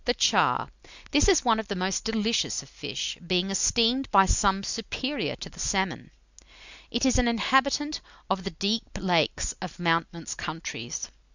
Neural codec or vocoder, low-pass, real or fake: none; 7.2 kHz; real